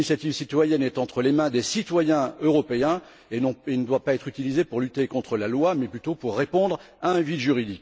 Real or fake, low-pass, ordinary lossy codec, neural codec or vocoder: real; none; none; none